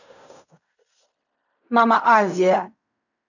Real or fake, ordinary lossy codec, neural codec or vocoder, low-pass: fake; none; codec, 16 kHz in and 24 kHz out, 0.4 kbps, LongCat-Audio-Codec, fine tuned four codebook decoder; 7.2 kHz